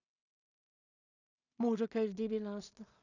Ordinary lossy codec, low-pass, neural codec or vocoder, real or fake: none; 7.2 kHz; codec, 16 kHz in and 24 kHz out, 0.4 kbps, LongCat-Audio-Codec, two codebook decoder; fake